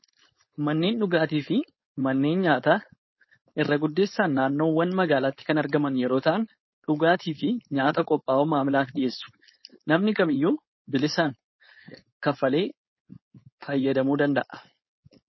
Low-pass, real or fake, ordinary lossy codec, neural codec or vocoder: 7.2 kHz; fake; MP3, 24 kbps; codec, 16 kHz, 4.8 kbps, FACodec